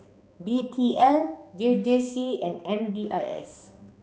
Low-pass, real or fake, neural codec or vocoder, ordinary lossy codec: none; fake; codec, 16 kHz, 2 kbps, X-Codec, HuBERT features, trained on balanced general audio; none